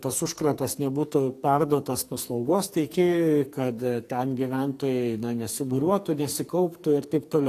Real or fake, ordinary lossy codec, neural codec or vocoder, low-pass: fake; AAC, 64 kbps; codec, 44.1 kHz, 2.6 kbps, SNAC; 14.4 kHz